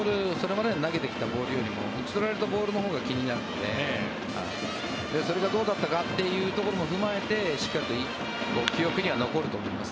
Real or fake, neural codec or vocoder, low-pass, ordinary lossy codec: real; none; none; none